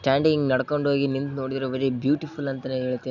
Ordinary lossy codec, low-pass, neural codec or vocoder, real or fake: none; 7.2 kHz; none; real